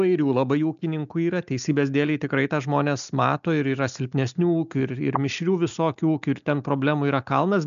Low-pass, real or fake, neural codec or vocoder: 7.2 kHz; real; none